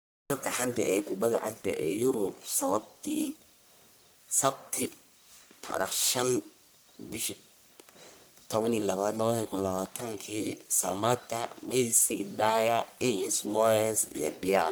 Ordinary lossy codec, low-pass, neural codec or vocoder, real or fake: none; none; codec, 44.1 kHz, 1.7 kbps, Pupu-Codec; fake